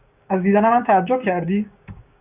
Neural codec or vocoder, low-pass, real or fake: codec, 16 kHz, 6 kbps, DAC; 3.6 kHz; fake